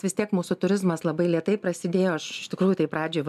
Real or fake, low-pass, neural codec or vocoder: real; 14.4 kHz; none